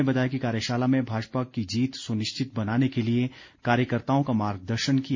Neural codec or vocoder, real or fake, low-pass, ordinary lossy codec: none; real; 7.2 kHz; MP3, 32 kbps